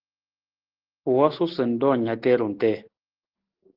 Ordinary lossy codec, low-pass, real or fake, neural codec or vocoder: Opus, 16 kbps; 5.4 kHz; real; none